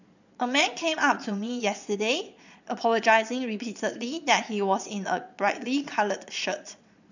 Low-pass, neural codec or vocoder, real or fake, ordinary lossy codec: 7.2 kHz; vocoder, 22.05 kHz, 80 mel bands, Vocos; fake; none